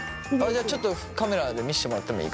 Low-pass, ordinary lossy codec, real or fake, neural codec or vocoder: none; none; real; none